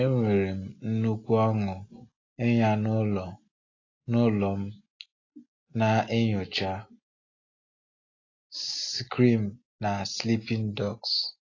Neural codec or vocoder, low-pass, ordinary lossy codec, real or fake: none; 7.2 kHz; AAC, 32 kbps; real